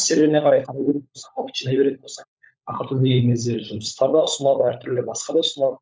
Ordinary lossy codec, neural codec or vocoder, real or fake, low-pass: none; codec, 16 kHz, 16 kbps, FunCodec, trained on LibriTTS, 50 frames a second; fake; none